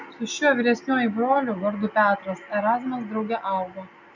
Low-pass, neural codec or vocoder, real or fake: 7.2 kHz; none; real